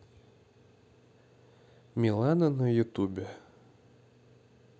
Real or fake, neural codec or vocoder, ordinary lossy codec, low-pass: real; none; none; none